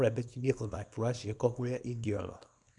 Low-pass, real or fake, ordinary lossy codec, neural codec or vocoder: 10.8 kHz; fake; none; codec, 24 kHz, 0.9 kbps, WavTokenizer, small release